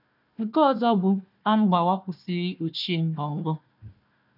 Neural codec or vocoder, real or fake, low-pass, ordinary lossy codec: codec, 16 kHz, 1 kbps, FunCodec, trained on Chinese and English, 50 frames a second; fake; 5.4 kHz; none